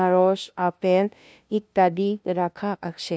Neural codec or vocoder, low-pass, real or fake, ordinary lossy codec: codec, 16 kHz, 0.5 kbps, FunCodec, trained on LibriTTS, 25 frames a second; none; fake; none